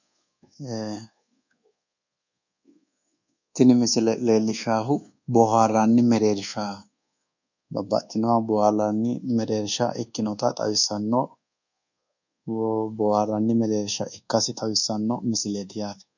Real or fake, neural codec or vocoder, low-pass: fake; codec, 24 kHz, 1.2 kbps, DualCodec; 7.2 kHz